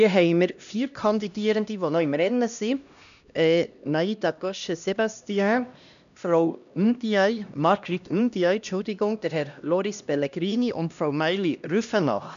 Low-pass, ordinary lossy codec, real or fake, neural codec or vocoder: 7.2 kHz; none; fake; codec, 16 kHz, 1 kbps, X-Codec, HuBERT features, trained on LibriSpeech